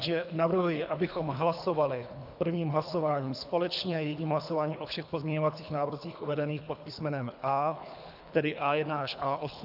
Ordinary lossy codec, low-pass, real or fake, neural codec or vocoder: AAC, 48 kbps; 5.4 kHz; fake; codec, 24 kHz, 3 kbps, HILCodec